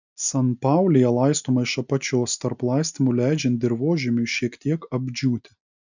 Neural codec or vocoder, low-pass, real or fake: none; 7.2 kHz; real